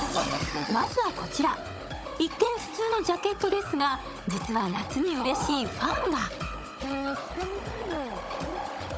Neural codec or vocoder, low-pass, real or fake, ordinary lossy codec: codec, 16 kHz, 16 kbps, FunCodec, trained on Chinese and English, 50 frames a second; none; fake; none